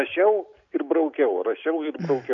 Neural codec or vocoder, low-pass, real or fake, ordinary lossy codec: none; 7.2 kHz; real; AAC, 64 kbps